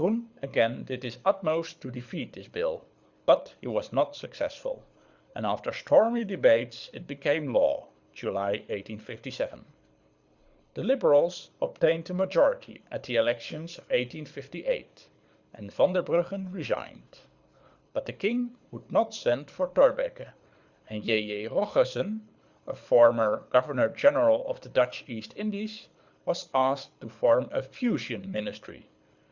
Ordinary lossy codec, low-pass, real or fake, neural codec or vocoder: Opus, 64 kbps; 7.2 kHz; fake; codec, 24 kHz, 6 kbps, HILCodec